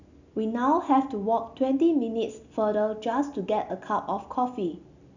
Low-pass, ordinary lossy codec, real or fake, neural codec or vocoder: 7.2 kHz; none; real; none